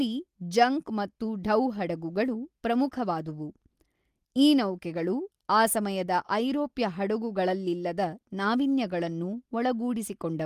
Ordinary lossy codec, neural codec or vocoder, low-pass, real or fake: Opus, 24 kbps; none; 14.4 kHz; real